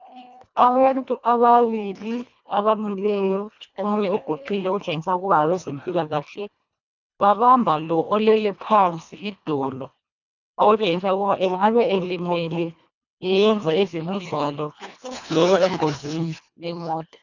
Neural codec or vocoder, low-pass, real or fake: codec, 24 kHz, 1.5 kbps, HILCodec; 7.2 kHz; fake